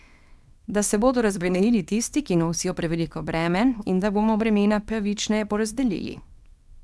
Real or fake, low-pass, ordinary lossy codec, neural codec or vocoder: fake; none; none; codec, 24 kHz, 0.9 kbps, WavTokenizer, small release